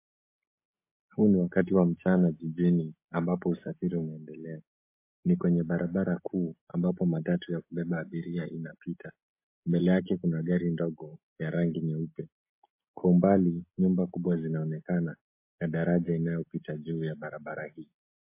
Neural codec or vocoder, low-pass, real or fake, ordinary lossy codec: none; 3.6 kHz; real; MP3, 24 kbps